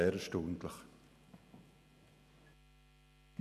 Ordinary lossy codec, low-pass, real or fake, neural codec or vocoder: none; 14.4 kHz; real; none